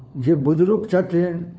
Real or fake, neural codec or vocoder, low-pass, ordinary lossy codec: fake; codec, 16 kHz, 4 kbps, FunCodec, trained on LibriTTS, 50 frames a second; none; none